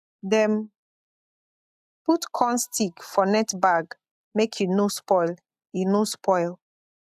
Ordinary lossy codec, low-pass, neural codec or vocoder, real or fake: AAC, 96 kbps; 14.4 kHz; none; real